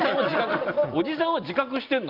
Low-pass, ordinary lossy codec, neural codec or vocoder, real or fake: 5.4 kHz; Opus, 24 kbps; none; real